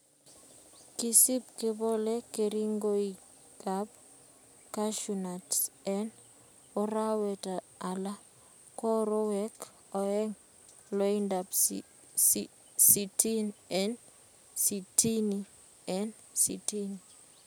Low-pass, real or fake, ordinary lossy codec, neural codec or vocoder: none; real; none; none